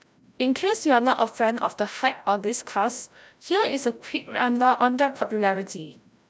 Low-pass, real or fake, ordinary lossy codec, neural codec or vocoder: none; fake; none; codec, 16 kHz, 0.5 kbps, FreqCodec, larger model